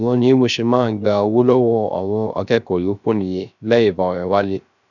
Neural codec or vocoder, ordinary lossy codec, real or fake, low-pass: codec, 16 kHz, 0.3 kbps, FocalCodec; none; fake; 7.2 kHz